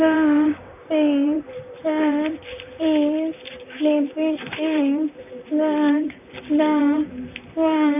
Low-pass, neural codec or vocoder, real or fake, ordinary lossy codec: 3.6 kHz; vocoder, 44.1 kHz, 128 mel bands, Pupu-Vocoder; fake; none